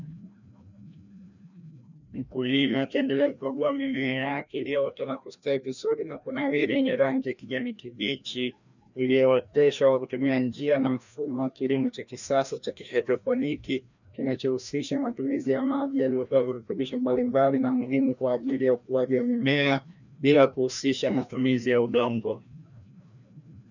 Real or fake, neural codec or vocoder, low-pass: fake; codec, 16 kHz, 1 kbps, FreqCodec, larger model; 7.2 kHz